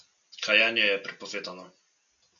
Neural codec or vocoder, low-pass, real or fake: none; 7.2 kHz; real